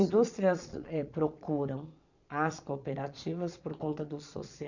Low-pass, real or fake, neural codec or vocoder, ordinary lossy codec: 7.2 kHz; fake; vocoder, 22.05 kHz, 80 mel bands, Vocos; none